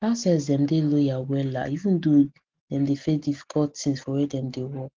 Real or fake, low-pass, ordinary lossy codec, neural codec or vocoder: real; 7.2 kHz; Opus, 16 kbps; none